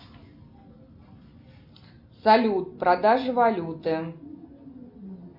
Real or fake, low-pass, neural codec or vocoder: real; 5.4 kHz; none